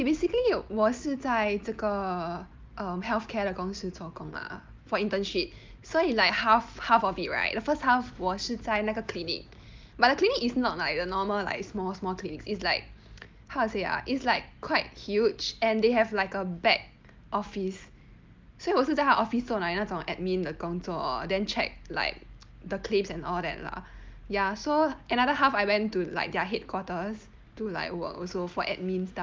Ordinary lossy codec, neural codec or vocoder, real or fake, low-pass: Opus, 24 kbps; none; real; 7.2 kHz